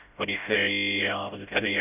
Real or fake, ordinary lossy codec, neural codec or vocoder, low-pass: fake; none; codec, 16 kHz, 0.5 kbps, FreqCodec, smaller model; 3.6 kHz